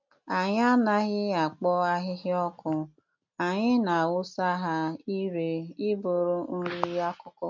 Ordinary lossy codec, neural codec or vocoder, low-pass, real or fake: MP3, 48 kbps; none; 7.2 kHz; real